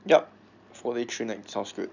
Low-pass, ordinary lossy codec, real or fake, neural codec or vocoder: 7.2 kHz; none; real; none